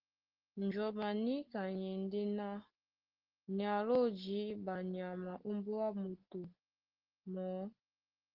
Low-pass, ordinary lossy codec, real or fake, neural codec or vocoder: 5.4 kHz; Opus, 16 kbps; real; none